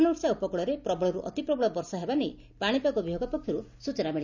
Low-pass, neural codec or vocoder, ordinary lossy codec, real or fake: 7.2 kHz; none; none; real